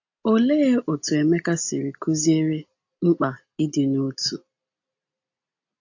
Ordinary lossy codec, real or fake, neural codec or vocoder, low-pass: AAC, 48 kbps; real; none; 7.2 kHz